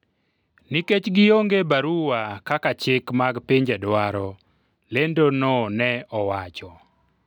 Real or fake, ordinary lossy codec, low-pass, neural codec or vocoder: real; none; 19.8 kHz; none